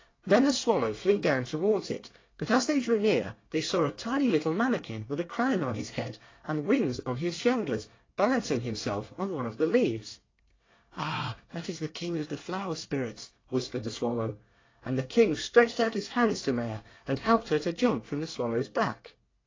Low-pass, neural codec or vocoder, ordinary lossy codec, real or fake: 7.2 kHz; codec, 24 kHz, 1 kbps, SNAC; AAC, 32 kbps; fake